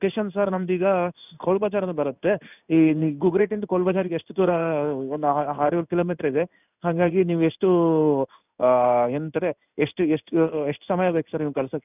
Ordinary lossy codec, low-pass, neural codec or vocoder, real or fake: none; 3.6 kHz; codec, 16 kHz in and 24 kHz out, 1 kbps, XY-Tokenizer; fake